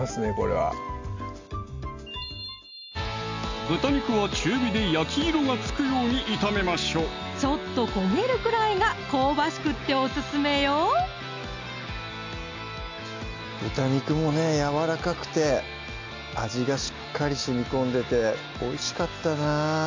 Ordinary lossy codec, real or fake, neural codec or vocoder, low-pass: MP3, 64 kbps; real; none; 7.2 kHz